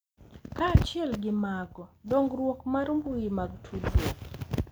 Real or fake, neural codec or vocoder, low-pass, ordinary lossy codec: real; none; none; none